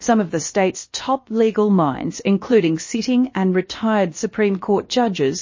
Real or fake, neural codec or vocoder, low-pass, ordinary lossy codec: fake; codec, 16 kHz, about 1 kbps, DyCAST, with the encoder's durations; 7.2 kHz; MP3, 32 kbps